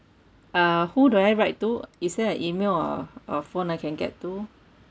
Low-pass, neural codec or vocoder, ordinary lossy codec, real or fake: none; none; none; real